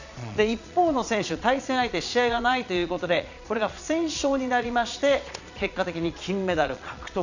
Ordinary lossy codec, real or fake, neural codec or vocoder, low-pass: none; fake; vocoder, 22.05 kHz, 80 mel bands, Vocos; 7.2 kHz